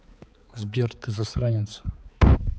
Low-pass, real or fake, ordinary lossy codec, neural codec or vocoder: none; fake; none; codec, 16 kHz, 4 kbps, X-Codec, HuBERT features, trained on balanced general audio